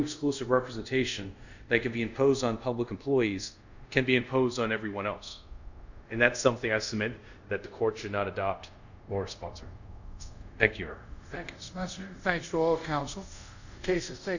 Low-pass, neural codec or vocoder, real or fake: 7.2 kHz; codec, 24 kHz, 0.5 kbps, DualCodec; fake